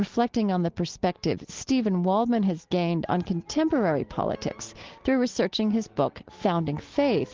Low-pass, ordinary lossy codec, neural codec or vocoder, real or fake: 7.2 kHz; Opus, 16 kbps; none; real